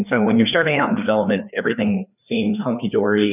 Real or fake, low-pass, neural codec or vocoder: fake; 3.6 kHz; codec, 16 kHz, 2 kbps, FreqCodec, larger model